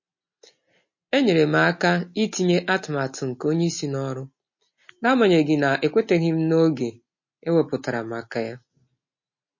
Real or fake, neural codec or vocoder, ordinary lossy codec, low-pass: real; none; MP3, 32 kbps; 7.2 kHz